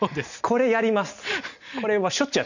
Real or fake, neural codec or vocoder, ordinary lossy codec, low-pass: real; none; none; 7.2 kHz